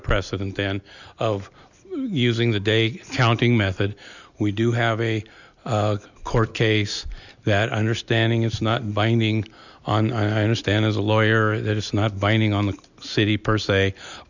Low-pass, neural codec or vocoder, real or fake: 7.2 kHz; none; real